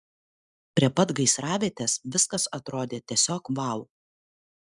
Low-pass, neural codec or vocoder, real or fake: 10.8 kHz; none; real